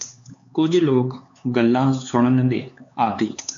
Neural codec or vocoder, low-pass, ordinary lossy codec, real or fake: codec, 16 kHz, 4 kbps, X-Codec, HuBERT features, trained on LibriSpeech; 7.2 kHz; MP3, 64 kbps; fake